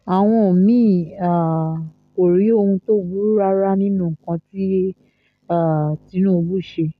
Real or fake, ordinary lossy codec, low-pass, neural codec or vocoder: real; none; 14.4 kHz; none